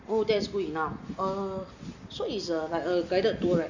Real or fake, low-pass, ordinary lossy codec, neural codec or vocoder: real; 7.2 kHz; none; none